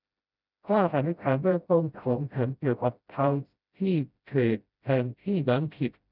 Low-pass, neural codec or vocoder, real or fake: 5.4 kHz; codec, 16 kHz, 0.5 kbps, FreqCodec, smaller model; fake